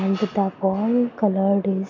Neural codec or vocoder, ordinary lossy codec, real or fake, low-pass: none; none; real; 7.2 kHz